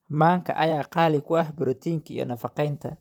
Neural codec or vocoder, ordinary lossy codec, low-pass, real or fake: vocoder, 44.1 kHz, 128 mel bands, Pupu-Vocoder; none; 19.8 kHz; fake